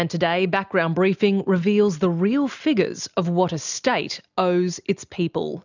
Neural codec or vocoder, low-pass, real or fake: none; 7.2 kHz; real